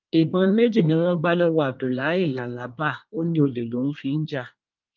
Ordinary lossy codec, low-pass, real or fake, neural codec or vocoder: Opus, 24 kbps; 7.2 kHz; fake; codec, 24 kHz, 1 kbps, SNAC